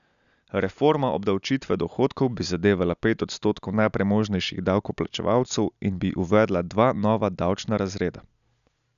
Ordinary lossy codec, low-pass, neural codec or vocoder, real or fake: none; 7.2 kHz; none; real